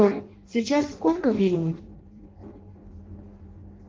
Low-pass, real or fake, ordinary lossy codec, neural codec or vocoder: 7.2 kHz; fake; Opus, 16 kbps; codec, 16 kHz in and 24 kHz out, 0.6 kbps, FireRedTTS-2 codec